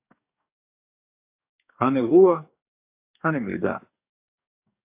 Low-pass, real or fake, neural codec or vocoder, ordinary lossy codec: 3.6 kHz; fake; codec, 44.1 kHz, 2.6 kbps, DAC; AAC, 24 kbps